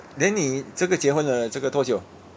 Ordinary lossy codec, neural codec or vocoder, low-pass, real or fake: none; none; none; real